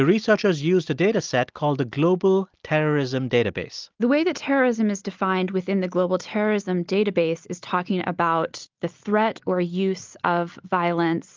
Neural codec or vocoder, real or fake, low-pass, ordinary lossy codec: none; real; 7.2 kHz; Opus, 32 kbps